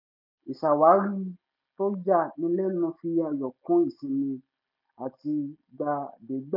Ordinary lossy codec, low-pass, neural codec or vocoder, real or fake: none; 5.4 kHz; none; real